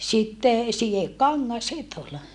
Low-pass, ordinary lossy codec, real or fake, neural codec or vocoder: 10.8 kHz; MP3, 96 kbps; real; none